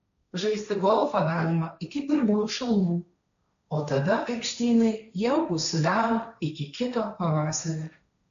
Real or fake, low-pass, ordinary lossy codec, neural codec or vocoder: fake; 7.2 kHz; AAC, 96 kbps; codec, 16 kHz, 1.1 kbps, Voila-Tokenizer